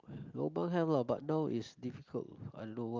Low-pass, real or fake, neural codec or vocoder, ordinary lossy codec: 7.2 kHz; real; none; Opus, 24 kbps